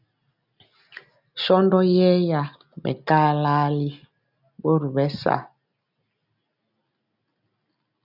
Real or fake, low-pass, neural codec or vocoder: real; 5.4 kHz; none